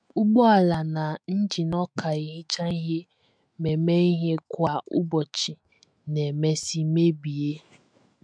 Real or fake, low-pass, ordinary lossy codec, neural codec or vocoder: fake; 9.9 kHz; MP3, 64 kbps; autoencoder, 48 kHz, 128 numbers a frame, DAC-VAE, trained on Japanese speech